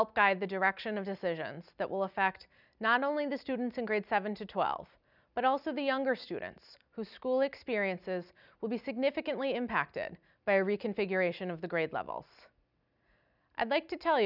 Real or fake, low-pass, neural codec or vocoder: real; 5.4 kHz; none